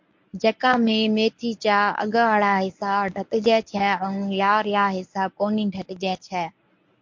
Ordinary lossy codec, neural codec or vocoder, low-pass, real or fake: MP3, 48 kbps; codec, 24 kHz, 0.9 kbps, WavTokenizer, medium speech release version 1; 7.2 kHz; fake